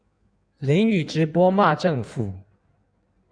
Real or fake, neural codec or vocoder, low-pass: fake; codec, 16 kHz in and 24 kHz out, 1.1 kbps, FireRedTTS-2 codec; 9.9 kHz